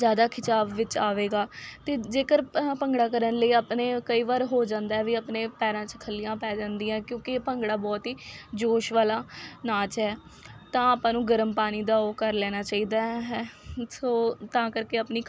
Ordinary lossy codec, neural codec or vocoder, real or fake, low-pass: none; none; real; none